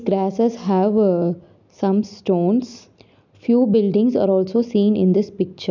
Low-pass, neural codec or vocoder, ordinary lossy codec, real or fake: 7.2 kHz; none; none; real